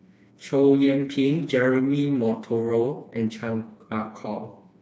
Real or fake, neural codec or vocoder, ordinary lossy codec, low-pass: fake; codec, 16 kHz, 2 kbps, FreqCodec, smaller model; none; none